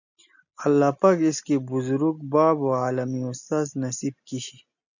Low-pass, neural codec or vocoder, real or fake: 7.2 kHz; none; real